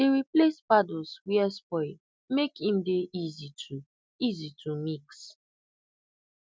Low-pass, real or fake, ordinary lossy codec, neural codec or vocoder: none; real; none; none